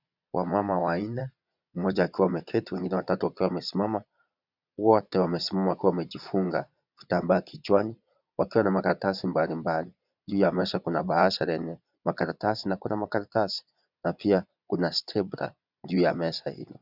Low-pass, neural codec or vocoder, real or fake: 5.4 kHz; vocoder, 22.05 kHz, 80 mel bands, Vocos; fake